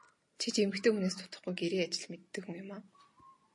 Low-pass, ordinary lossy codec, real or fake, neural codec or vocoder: 10.8 kHz; MP3, 48 kbps; real; none